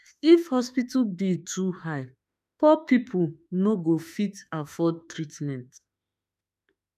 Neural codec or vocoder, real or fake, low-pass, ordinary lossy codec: autoencoder, 48 kHz, 32 numbers a frame, DAC-VAE, trained on Japanese speech; fake; 14.4 kHz; none